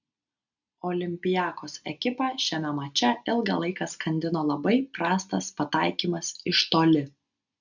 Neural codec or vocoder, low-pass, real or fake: none; 7.2 kHz; real